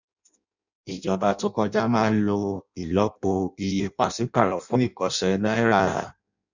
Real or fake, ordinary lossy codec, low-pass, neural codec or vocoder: fake; none; 7.2 kHz; codec, 16 kHz in and 24 kHz out, 0.6 kbps, FireRedTTS-2 codec